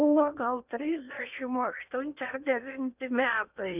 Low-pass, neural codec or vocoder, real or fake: 3.6 kHz; codec, 16 kHz in and 24 kHz out, 0.8 kbps, FocalCodec, streaming, 65536 codes; fake